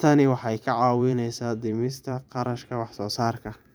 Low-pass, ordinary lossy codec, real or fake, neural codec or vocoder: none; none; fake; vocoder, 44.1 kHz, 128 mel bands every 512 samples, BigVGAN v2